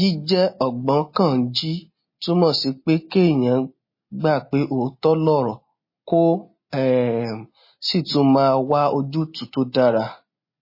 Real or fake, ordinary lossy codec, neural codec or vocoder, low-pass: real; MP3, 24 kbps; none; 5.4 kHz